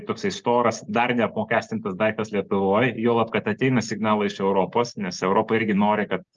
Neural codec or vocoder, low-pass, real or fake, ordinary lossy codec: none; 7.2 kHz; real; Opus, 24 kbps